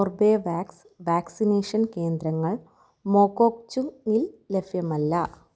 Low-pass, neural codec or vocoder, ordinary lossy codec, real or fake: none; none; none; real